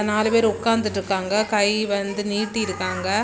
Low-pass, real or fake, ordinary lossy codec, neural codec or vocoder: none; real; none; none